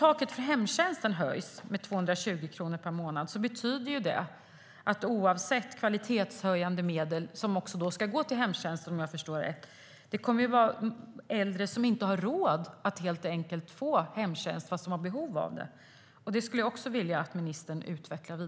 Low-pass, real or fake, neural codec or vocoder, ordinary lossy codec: none; real; none; none